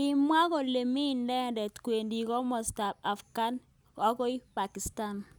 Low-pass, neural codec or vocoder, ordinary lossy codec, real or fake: none; none; none; real